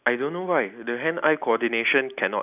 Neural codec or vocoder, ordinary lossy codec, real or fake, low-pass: none; none; real; 3.6 kHz